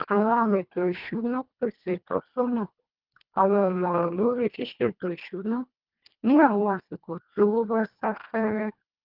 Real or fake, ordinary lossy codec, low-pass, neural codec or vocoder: fake; Opus, 32 kbps; 5.4 kHz; codec, 24 kHz, 1.5 kbps, HILCodec